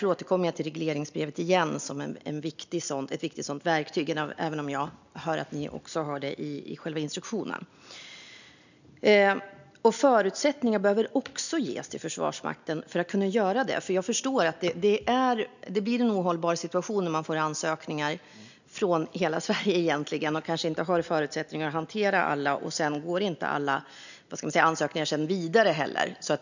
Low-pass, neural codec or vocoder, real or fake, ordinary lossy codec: 7.2 kHz; none; real; none